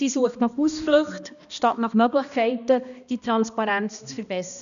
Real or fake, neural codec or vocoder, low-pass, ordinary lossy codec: fake; codec, 16 kHz, 1 kbps, X-Codec, HuBERT features, trained on balanced general audio; 7.2 kHz; none